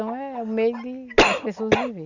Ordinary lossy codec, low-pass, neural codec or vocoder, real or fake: none; 7.2 kHz; none; real